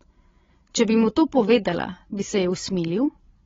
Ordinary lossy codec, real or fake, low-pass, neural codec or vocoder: AAC, 24 kbps; fake; 7.2 kHz; codec, 16 kHz, 16 kbps, FreqCodec, larger model